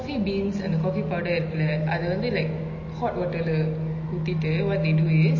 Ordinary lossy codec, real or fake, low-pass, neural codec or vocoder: MP3, 32 kbps; real; 7.2 kHz; none